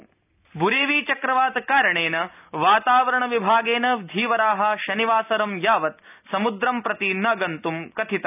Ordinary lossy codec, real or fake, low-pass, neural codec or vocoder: none; real; 3.6 kHz; none